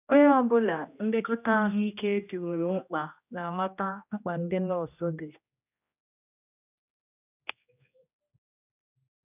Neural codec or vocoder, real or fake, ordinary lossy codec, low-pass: codec, 16 kHz, 1 kbps, X-Codec, HuBERT features, trained on general audio; fake; none; 3.6 kHz